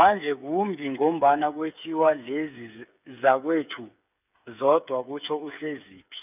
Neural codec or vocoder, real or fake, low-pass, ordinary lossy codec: codec, 16 kHz, 8 kbps, FreqCodec, smaller model; fake; 3.6 kHz; none